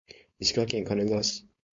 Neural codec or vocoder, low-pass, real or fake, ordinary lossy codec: codec, 16 kHz, 4.8 kbps, FACodec; 7.2 kHz; fake; MP3, 48 kbps